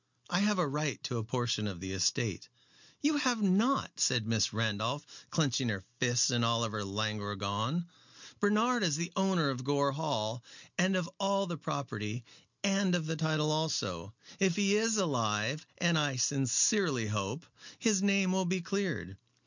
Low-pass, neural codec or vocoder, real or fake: 7.2 kHz; none; real